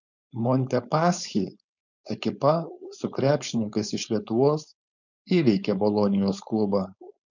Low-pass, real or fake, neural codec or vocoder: 7.2 kHz; fake; codec, 16 kHz, 4.8 kbps, FACodec